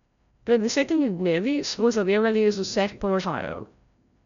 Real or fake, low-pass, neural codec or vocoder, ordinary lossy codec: fake; 7.2 kHz; codec, 16 kHz, 0.5 kbps, FreqCodec, larger model; none